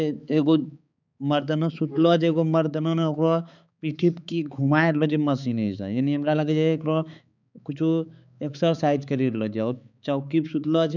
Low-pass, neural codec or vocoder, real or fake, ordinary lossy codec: 7.2 kHz; codec, 16 kHz, 4 kbps, X-Codec, HuBERT features, trained on balanced general audio; fake; none